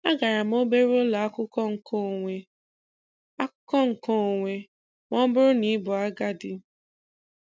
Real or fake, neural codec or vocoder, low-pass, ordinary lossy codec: real; none; none; none